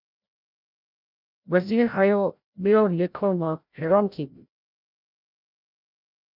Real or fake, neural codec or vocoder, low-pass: fake; codec, 16 kHz, 0.5 kbps, FreqCodec, larger model; 5.4 kHz